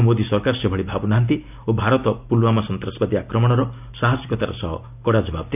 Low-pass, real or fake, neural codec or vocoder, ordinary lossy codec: 3.6 kHz; real; none; none